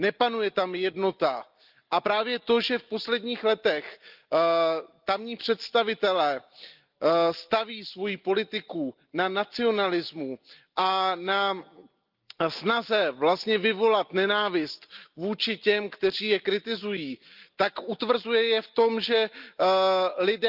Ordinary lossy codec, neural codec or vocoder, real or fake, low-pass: Opus, 24 kbps; none; real; 5.4 kHz